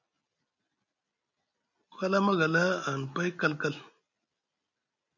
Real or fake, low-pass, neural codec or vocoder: real; 7.2 kHz; none